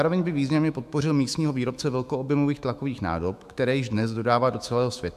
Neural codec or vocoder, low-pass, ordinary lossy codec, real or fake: autoencoder, 48 kHz, 128 numbers a frame, DAC-VAE, trained on Japanese speech; 14.4 kHz; AAC, 96 kbps; fake